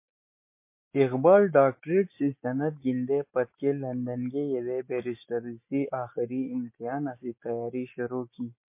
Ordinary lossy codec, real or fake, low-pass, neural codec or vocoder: MP3, 24 kbps; real; 3.6 kHz; none